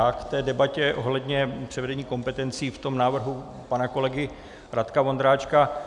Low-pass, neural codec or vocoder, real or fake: 10.8 kHz; none; real